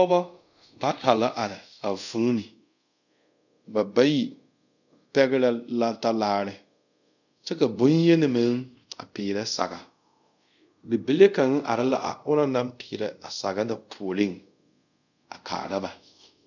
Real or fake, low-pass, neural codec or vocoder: fake; 7.2 kHz; codec, 24 kHz, 0.5 kbps, DualCodec